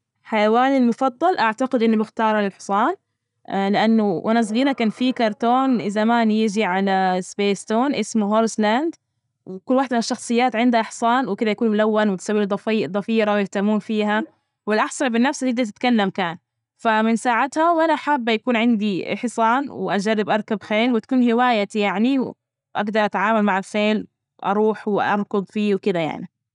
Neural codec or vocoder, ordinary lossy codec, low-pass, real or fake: none; none; 10.8 kHz; real